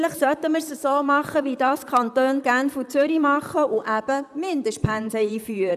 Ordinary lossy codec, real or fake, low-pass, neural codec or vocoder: none; fake; 14.4 kHz; vocoder, 44.1 kHz, 128 mel bands, Pupu-Vocoder